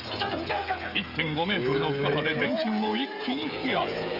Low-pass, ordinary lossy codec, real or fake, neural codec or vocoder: 5.4 kHz; Opus, 64 kbps; fake; codec, 16 kHz, 16 kbps, FreqCodec, smaller model